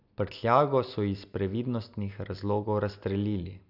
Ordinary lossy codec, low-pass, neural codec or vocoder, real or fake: none; 5.4 kHz; none; real